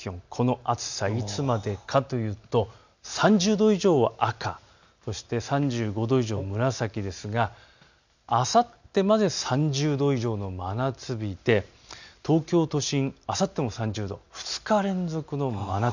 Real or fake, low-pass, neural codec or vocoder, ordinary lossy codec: real; 7.2 kHz; none; none